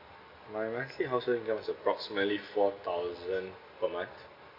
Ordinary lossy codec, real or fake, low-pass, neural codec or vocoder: MP3, 32 kbps; real; 5.4 kHz; none